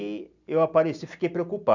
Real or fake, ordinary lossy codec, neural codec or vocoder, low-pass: real; none; none; 7.2 kHz